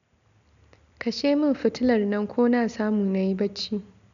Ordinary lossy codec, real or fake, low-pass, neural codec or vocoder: none; real; 7.2 kHz; none